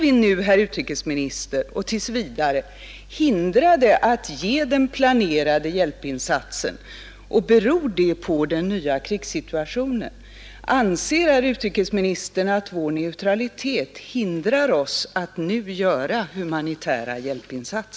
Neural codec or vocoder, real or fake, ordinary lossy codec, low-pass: none; real; none; none